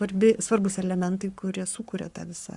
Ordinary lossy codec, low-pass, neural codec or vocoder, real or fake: Opus, 64 kbps; 10.8 kHz; codec, 44.1 kHz, 7.8 kbps, Pupu-Codec; fake